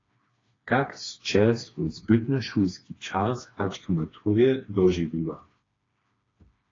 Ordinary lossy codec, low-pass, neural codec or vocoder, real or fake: AAC, 32 kbps; 7.2 kHz; codec, 16 kHz, 2 kbps, FreqCodec, smaller model; fake